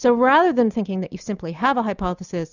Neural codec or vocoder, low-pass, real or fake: none; 7.2 kHz; real